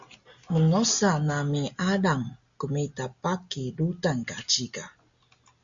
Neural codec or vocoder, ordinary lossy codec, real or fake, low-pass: none; Opus, 64 kbps; real; 7.2 kHz